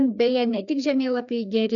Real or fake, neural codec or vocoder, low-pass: fake; codec, 16 kHz, 2 kbps, FreqCodec, larger model; 7.2 kHz